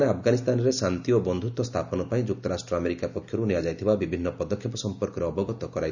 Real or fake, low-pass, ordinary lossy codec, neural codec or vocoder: real; 7.2 kHz; none; none